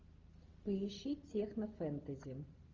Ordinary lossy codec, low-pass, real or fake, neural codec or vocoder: Opus, 32 kbps; 7.2 kHz; real; none